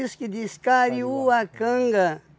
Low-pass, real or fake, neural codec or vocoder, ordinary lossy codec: none; real; none; none